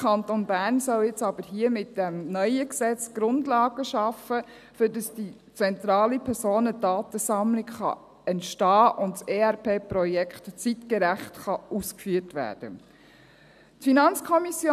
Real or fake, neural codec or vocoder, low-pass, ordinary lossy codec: real; none; 14.4 kHz; none